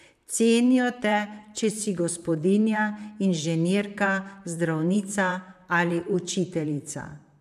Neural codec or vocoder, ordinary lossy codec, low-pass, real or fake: vocoder, 44.1 kHz, 128 mel bands, Pupu-Vocoder; MP3, 96 kbps; 14.4 kHz; fake